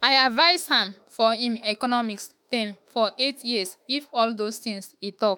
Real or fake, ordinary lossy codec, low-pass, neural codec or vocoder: fake; none; none; autoencoder, 48 kHz, 32 numbers a frame, DAC-VAE, trained on Japanese speech